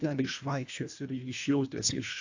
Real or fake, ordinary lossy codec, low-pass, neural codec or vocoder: fake; AAC, 48 kbps; 7.2 kHz; codec, 24 kHz, 1.5 kbps, HILCodec